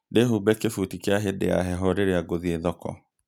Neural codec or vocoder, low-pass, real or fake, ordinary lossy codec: none; 19.8 kHz; real; none